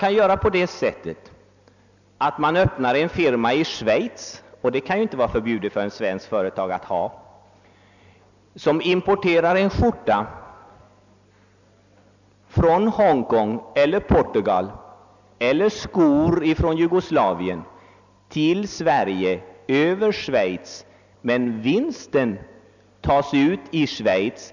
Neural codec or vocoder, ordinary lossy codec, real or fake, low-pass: none; none; real; 7.2 kHz